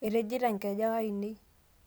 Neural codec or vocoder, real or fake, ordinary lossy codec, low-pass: none; real; none; none